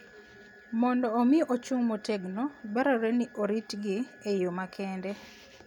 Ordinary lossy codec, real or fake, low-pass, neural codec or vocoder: none; real; 19.8 kHz; none